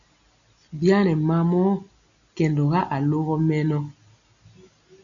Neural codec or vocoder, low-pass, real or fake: none; 7.2 kHz; real